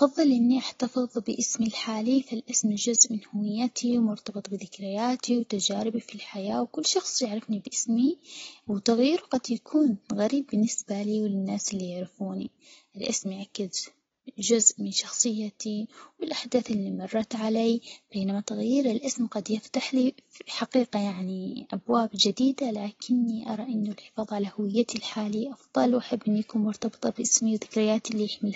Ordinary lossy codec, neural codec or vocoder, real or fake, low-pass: AAC, 24 kbps; none; real; 7.2 kHz